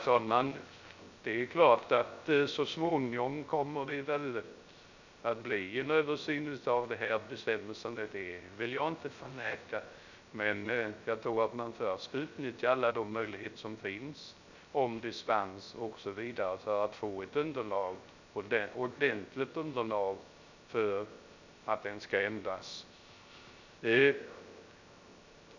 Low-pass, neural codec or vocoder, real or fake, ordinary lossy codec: 7.2 kHz; codec, 16 kHz, 0.3 kbps, FocalCodec; fake; none